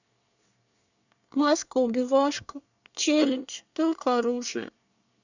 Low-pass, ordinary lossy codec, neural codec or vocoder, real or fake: 7.2 kHz; none; codec, 24 kHz, 1 kbps, SNAC; fake